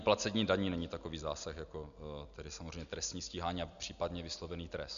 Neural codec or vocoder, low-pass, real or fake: none; 7.2 kHz; real